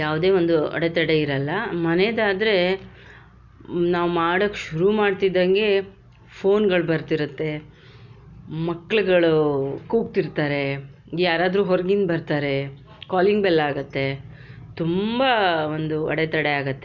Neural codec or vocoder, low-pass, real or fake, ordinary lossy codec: none; 7.2 kHz; real; none